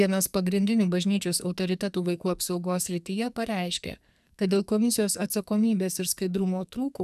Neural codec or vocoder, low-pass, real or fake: codec, 44.1 kHz, 2.6 kbps, SNAC; 14.4 kHz; fake